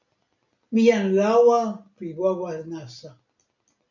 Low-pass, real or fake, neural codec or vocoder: 7.2 kHz; real; none